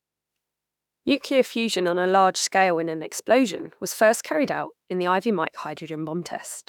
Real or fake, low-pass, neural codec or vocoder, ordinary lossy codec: fake; 19.8 kHz; autoencoder, 48 kHz, 32 numbers a frame, DAC-VAE, trained on Japanese speech; none